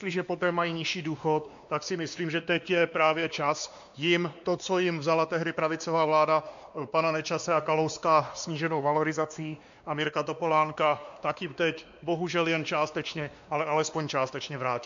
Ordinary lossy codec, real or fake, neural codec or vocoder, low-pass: MP3, 64 kbps; fake; codec, 16 kHz, 2 kbps, X-Codec, WavLM features, trained on Multilingual LibriSpeech; 7.2 kHz